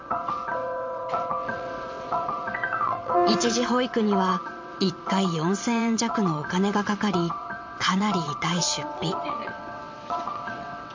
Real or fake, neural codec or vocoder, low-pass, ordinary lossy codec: fake; vocoder, 22.05 kHz, 80 mel bands, WaveNeXt; 7.2 kHz; MP3, 48 kbps